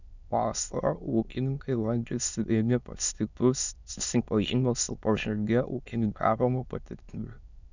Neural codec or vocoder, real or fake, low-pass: autoencoder, 22.05 kHz, a latent of 192 numbers a frame, VITS, trained on many speakers; fake; 7.2 kHz